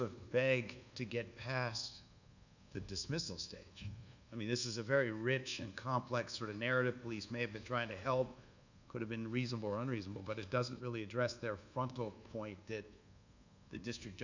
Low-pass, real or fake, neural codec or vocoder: 7.2 kHz; fake; codec, 24 kHz, 1.2 kbps, DualCodec